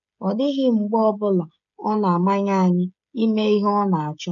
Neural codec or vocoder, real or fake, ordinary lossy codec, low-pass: codec, 16 kHz, 16 kbps, FreqCodec, smaller model; fake; none; 7.2 kHz